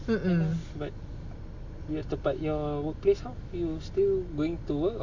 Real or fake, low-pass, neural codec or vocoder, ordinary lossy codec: real; 7.2 kHz; none; AAC, 48 kbps